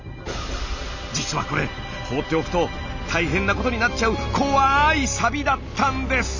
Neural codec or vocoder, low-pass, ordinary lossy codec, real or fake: none; 7.2 kHz; none; real